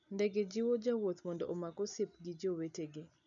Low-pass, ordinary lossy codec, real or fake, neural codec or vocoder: 7.2 kHz; none; real; none